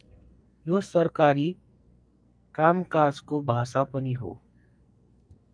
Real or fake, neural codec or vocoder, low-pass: fake; codec, 44.1 kHz, 2.6 kbps, SNAC; 9.9 kHz